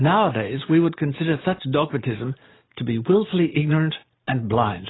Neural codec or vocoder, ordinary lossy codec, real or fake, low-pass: none; AAC, 16 kbps; real; 7.2 kHz